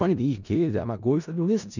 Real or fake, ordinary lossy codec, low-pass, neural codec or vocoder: fake; none; 7.2 kHz; codec, 16 kHz in and 24 kHz out, 0.4 kbps, LongCat-Audio-Codec, four codebook decoder